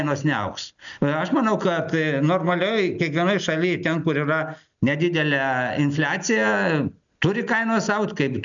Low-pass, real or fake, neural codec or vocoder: 7.2 kHz; real; none